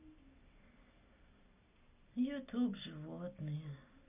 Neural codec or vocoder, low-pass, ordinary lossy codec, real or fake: none; 3.6 kHz; none; real